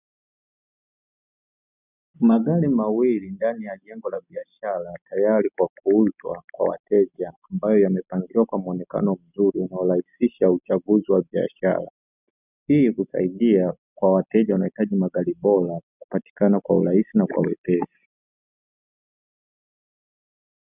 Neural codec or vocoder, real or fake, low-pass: none; real; 3.6 kHz